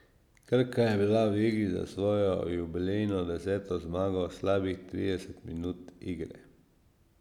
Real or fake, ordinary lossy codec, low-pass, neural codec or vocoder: real; none; 19.8 kHz; none